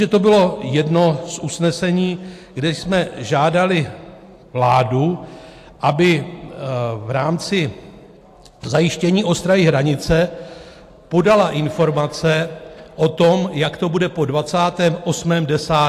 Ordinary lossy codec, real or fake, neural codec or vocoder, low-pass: AAC, 64 kbps; real; none; 14.4 kHz